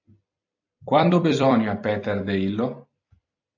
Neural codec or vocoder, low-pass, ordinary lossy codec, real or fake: vocoder, 44.1 kHz, 128 mel bands every 256 samples, BigVGAN v2; 7.2 kHz; AAC, 48 kbps; fake